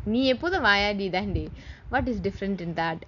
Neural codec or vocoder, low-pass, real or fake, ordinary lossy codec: none; 7.2 kHz; real; none